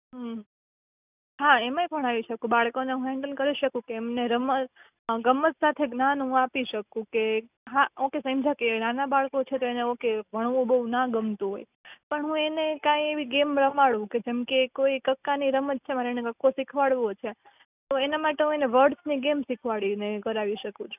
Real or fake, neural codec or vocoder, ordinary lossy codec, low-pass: real; none; none; 3.6 kHz